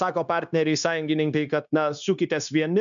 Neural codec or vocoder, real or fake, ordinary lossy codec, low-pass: codec, 16 kHz, 0.9 kbps, LongCat-Audio-Codec; fake; MP3, 96 kbps; 7.2 kHz